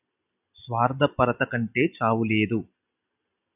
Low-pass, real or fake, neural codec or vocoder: 3.6 kHz; real; none